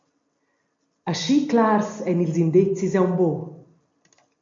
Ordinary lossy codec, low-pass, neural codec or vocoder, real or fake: MP3, 48 kbps; 7.2 kHz; none; real